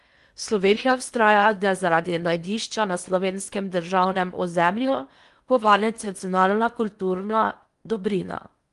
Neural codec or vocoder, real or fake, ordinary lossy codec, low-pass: codec, 16 kHz in and 24 kHz out, 0.8 kbps, FocalCodec, streaming, 65536 codes; fake; Opus, 32 kbps; 10.8 kHz